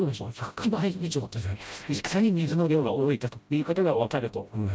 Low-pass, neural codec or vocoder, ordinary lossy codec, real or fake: none; codec, 16 kHz, 0.5 kbps, FreqCodec, smaller model; none; fake